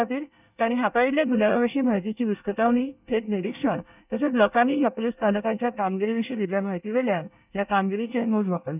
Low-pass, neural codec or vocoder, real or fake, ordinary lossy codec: 3.6 kHz; codec, 24 kHz, 1 kbps, SNAC; fake; none